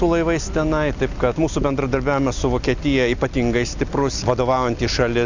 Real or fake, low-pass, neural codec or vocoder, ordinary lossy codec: real; 7.2 kHz; none; Opus, 64 kbps